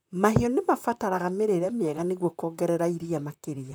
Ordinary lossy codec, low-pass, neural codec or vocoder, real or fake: none; none; vocoder, 44.1 kHz, 128 mel bands, Pupu-Vocoder; fake